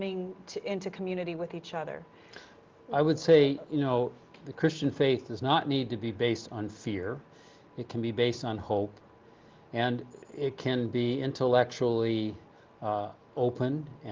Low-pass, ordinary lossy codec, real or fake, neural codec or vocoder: 7.2 kHz; Opus, 32 kbps; real; none